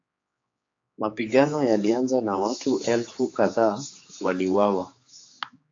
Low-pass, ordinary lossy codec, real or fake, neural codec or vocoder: 7.2 kHz; AAC, 48 kbps; fake; codec, 16 kHz, 4 kbps, X-Codec, HuBERT features, trained on general audio